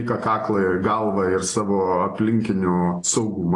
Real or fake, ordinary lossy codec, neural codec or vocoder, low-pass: real; AAC, 32 kbps; none; 10.8 kHz